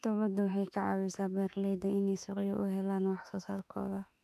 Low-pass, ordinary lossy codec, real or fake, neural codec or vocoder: 14.4 kHz; none; fake; autoencoder, 48 kHz, 32 numbers a frame, DAC-VAE, trained on Japanese speech